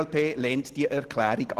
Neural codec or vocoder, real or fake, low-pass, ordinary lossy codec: none; real; 14.4 kHz; Opus, 16 kbps